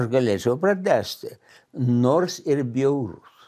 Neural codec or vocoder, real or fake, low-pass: none; real; 14.4 kHz